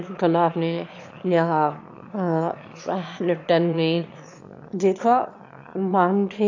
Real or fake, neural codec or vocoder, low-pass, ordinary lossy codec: fake; autoencoder, 22.05 kHz, a latent of 192 numbers a frame, VITS, trained on one speaker; 7.2 kHz; AAC, 48 kbps